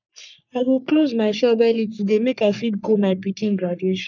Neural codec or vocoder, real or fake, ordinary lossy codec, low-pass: codec, 44.1 kHz, 3.4 kbps, Pupu-Codec; fake; none; 7.2 kHz